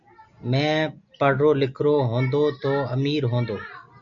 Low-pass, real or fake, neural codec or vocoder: 7.2 kHz; real; none